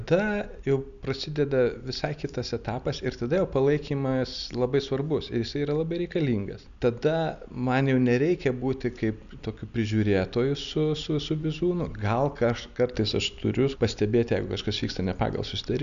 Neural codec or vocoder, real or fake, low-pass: none; real; 7.2 kHz